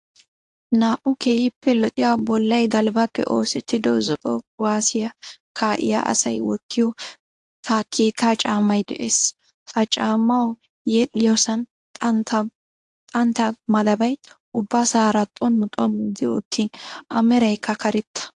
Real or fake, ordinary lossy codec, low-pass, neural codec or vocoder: fake; AAC, 64 kbps; 10.8 kHz; codec, 24 kHz, 0.9 kbps, WavTokenizer, medium speech release version 1